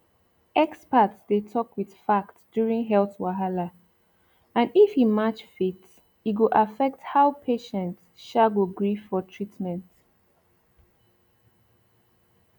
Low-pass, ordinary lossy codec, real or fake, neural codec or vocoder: 19.8 kHz; none; real; none